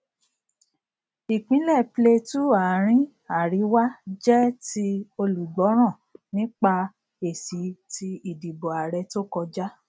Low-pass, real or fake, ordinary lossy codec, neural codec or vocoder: none; real; none; none